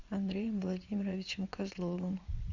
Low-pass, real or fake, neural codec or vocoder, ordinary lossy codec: 7.2 kHz; fake; vocoder, 22.05 kHz, 80 mel bands, WaveNeXt; Opus, 64 kbps